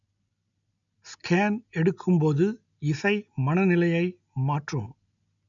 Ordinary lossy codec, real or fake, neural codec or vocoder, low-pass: none; real; none; 7.2 kHz